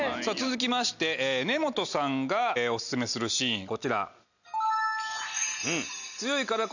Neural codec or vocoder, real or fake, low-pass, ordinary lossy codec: none; real; 7.2 kHz; none